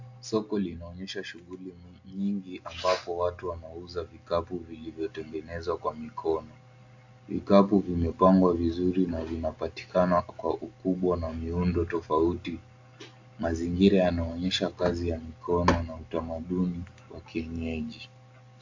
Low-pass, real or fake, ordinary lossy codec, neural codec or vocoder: 7.2 kHz; real; AAC, 48 kbps; none